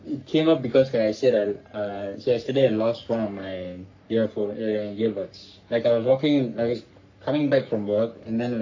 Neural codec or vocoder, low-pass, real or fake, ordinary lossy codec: codec, 44.1 kHz, 3.4 kbps, Pupu-Codec; 7.2 kHz; fake; AAC, 48 kbps